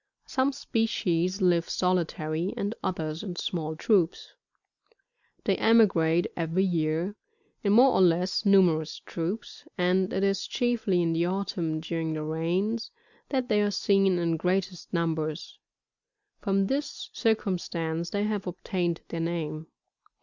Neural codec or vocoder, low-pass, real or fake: none; 7.2 kHz; real